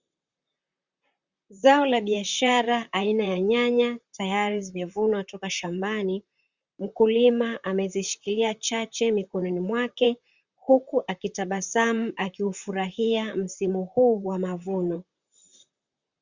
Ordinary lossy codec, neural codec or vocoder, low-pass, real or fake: Opus, 64 kbps; vocoder, 44.1 kHz, 128 mel bands, Pupu-Vocoder; 7.2 kHz; fake